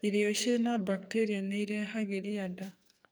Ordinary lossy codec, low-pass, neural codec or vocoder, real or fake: none; none; codec, 44.1 kHz, 2.6 kbps, SNAC; fake